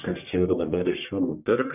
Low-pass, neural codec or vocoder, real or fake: 3.6 kHz; codec, 44.1 kHz, 1.7 kbps, Pupu-Codec; fake